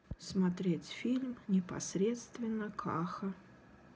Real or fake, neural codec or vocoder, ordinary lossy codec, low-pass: real; none; none; none